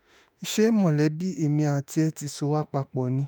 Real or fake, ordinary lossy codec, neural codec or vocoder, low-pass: fake; none; autoencoder, 48 kHz, 32 numbers a frame, DAC-VAE, trained on Japanese speech; none